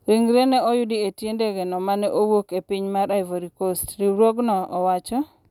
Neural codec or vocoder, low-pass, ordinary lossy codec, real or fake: none; 19.8 kHz; none; real